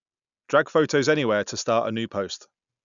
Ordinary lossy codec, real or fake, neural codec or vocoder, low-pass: none; real; none; 7.2 kHz